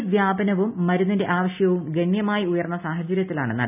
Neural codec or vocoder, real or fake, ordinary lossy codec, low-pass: none; real; none; 3.6 kHz